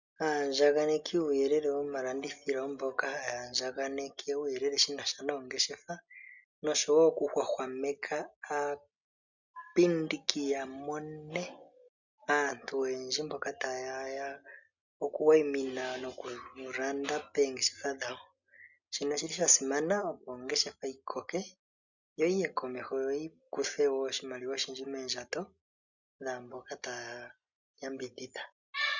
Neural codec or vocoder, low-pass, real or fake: none; 7.2 kHz; real